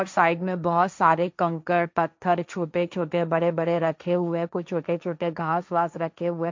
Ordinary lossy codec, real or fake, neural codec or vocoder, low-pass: MP3, 64 kbps; fake; codec, 16 kHz, 1.1 kbps, Voila-Tokenizer; 7.2 kHz